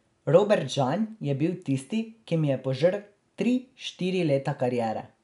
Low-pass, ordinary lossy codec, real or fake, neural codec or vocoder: 10.8 kHz; none; real; none